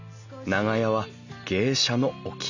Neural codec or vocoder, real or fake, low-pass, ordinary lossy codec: none; real; 7.2 kHz; none